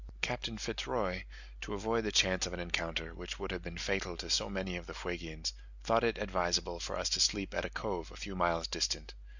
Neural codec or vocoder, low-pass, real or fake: none; 7.2 kHz; real